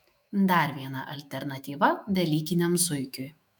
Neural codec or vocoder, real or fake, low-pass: autoencoder, 48 kHz, 128 numbers a frame, DAC-VAE, trained on Japanese speech; fake; 19.8 kHz